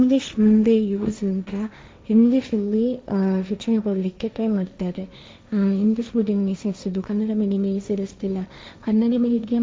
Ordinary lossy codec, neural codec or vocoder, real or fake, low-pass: none; codec, 16 kHz, 1.1 kbps, Voila-Tokenizer; fake; none